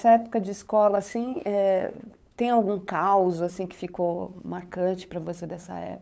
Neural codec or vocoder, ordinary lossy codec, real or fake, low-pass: codec, 16 kHz, 8 kbps, FunCodec, trained on LibriTTS, 25 frames a second; none; fake; none